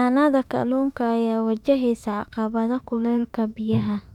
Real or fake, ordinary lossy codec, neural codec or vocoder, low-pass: fake; none; autoencoder, 48 kHz, 32 numbers a frame, DAC-VAE, trained on Japanese speech; 19.8 kHz